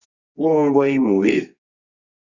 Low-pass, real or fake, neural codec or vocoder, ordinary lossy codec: 7.2 kHz; fake; codec, 24 kHz, 0.9 kbps, WavTokenizer, medium music audio release; Opus, 64 kbps